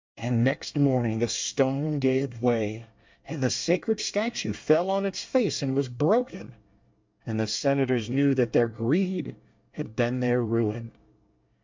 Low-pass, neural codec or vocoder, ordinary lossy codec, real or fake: 7.2 kHz; codec, 24 kHz, 1 kbps, SNAC; MP3, 64 kbps; fake